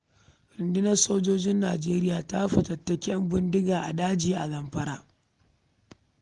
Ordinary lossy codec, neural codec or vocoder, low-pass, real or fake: Opus, 24 kbps; none; 10.8 kHz; real